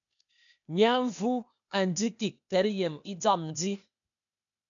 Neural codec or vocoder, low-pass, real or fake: codec, 16 kHz, 0.8 kbps, ZipCodec; 7.2 kHz; fake